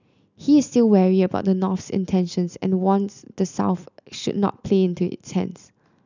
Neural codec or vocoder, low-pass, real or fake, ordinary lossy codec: none; 7.2 kHz; real; none